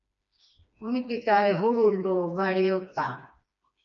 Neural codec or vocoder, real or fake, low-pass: codec, 16 kHz, 2 kbps, FreqCodec, smaller model; fake; 7.2 kHz